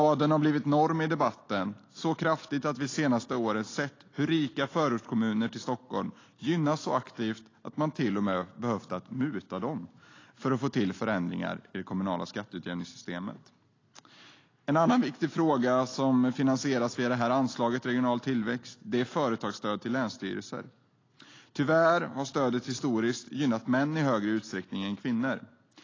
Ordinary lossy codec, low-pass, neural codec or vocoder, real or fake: AAC, 32 kbps; 7.2 kHz; none; real